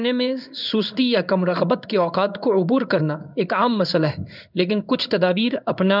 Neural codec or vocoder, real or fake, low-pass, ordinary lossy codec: codec, 16 kHz in and 24 kHz out, 1 kbps, XY-Tokenizer; fake; 5.4 kHz; none